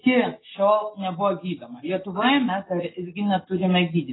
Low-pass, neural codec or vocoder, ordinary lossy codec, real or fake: 7.2 kHz; none; AAC, 16 kbps; real